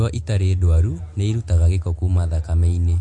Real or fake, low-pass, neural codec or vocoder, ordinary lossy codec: real; 10.8 kHz; none; MP3, 48 kbps